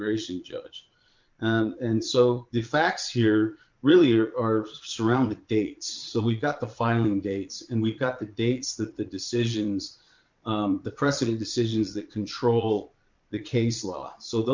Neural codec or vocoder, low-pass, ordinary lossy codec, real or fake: vocoder, 22.05 kHz, 80 mel bands, Vocos; 7.2 kHz; MP3, 64 kbps; fake